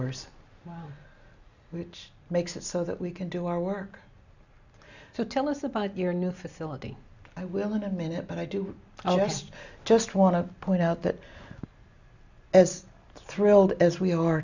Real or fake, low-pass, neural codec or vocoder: real; 7.2 kHz; none